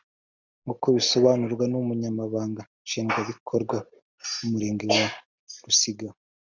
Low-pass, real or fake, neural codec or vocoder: 7.2 kHz; real; none